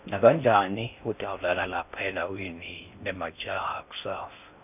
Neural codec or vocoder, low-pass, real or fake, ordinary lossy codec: codec, 16 kHz in and 24 kHz out, 0.6 kbps, FocalCodec, streaming, 4096 codes; 3.6 kHz; fake; none